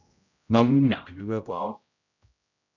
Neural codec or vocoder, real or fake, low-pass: codec, 16 kHz, 0.5 kbps, X-Codec, HuBERT features, trained on general audio; fake; 7.2 kHz